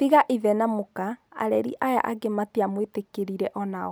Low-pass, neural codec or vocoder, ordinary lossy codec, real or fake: none; none; none; real